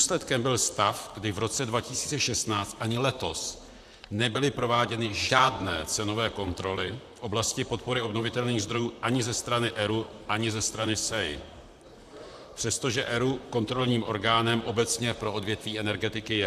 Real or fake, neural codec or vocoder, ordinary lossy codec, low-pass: fake; vocoder, 44.1 kHz, 128 mel bands, Pupu-Vocoder; AAC, 96 kbps; 14.4 kHz